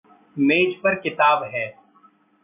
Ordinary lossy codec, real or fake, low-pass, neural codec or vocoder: AAC, 24 kbps; real; 3.6 kHz; none